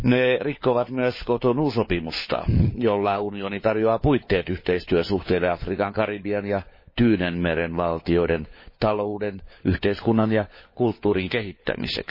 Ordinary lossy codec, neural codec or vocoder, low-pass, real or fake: MP3, 24 kbps; codec, 16 kHz, 4 kbps, FunCodec, trained on LibriTTS, 50 frames a second; 5.4 kHz; fake